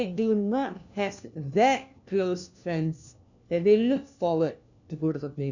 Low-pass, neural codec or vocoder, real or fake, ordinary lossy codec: 7.2 kHz; codec, 16 kHz, 1 kbps, FunCodec, trained on LibriTTS, 50 frames a second; fake; none